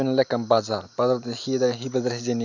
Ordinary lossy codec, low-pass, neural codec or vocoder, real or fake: none; 7.2 kHz; none; real